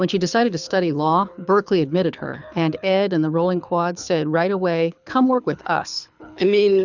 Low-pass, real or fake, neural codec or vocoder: 7.2 kHz; fake; codec, 24 kHz, 6 kbps, HILCodec